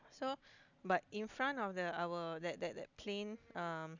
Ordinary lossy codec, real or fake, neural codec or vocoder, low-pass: none; real; none; 7.2 kHz